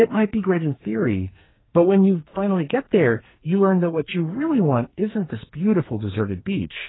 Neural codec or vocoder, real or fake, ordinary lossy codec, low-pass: codec, 44.1 kHz, 2.6 kbps, SNAC; fake; AAC, 16 kbps; 7.2 kHz